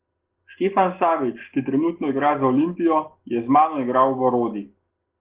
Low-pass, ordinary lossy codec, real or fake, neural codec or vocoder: 3.6 kHz; Opus, 16 kbps; real; none